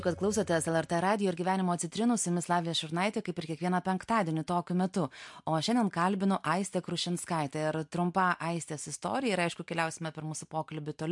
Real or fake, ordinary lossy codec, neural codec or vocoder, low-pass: real; MP3, 64 kbps; none; 10.8 kHz